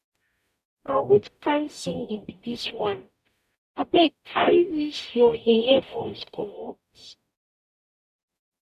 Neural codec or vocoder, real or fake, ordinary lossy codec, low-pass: codec, 44.1 kHz, 0.9 kbps, DAC; fake; none; 14.4 kHz